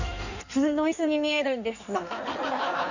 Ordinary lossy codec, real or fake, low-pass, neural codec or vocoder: none; fake; 7.2 kHz; codec, 16 kHz in and 24 kHz out, 1.1 kbps, FireRedTTS-2 codec